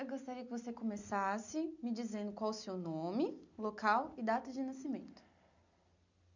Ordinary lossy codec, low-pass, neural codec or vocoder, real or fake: none; 7.2 kHz; none; real